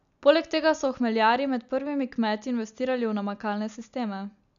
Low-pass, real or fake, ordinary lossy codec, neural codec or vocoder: 7.2 kHz; real; none; none